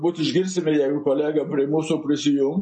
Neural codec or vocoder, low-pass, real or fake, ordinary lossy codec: none; 10.8 kHz; real; MP3, 32 kbps